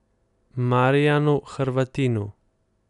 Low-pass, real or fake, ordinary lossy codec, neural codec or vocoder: 10.8 kHz; real; none; none